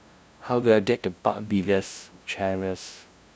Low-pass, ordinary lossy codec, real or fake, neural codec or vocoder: none; none; fake; codec, 16 kHz, 0.5 kbps, FunCodec, trained on LibriTTS, 25 frames a second